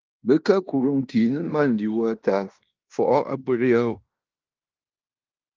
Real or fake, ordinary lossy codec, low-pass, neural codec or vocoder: fake; Opus, 32 kbps; 7.2 kHz; codec, 16 kHz in and 24 kHz out, 0.9 kbps, LongCat-Audio-Codec, fine tuned four codebook decoder